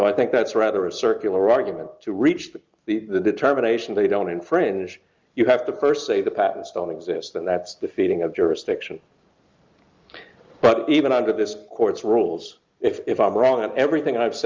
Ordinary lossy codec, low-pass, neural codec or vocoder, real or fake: Opus, 24 kbps; 7.2 kHz; none; real